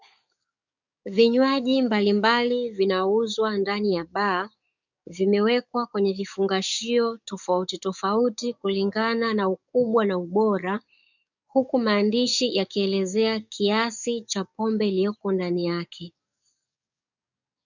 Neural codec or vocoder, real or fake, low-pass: codec, 44.1 kHz, 7.8 kbps, DAC; fake; 7.2 kHz